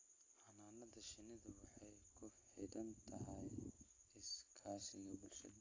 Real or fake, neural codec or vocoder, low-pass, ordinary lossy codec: real; none; 7.2 kHz; AAC, 32 kbps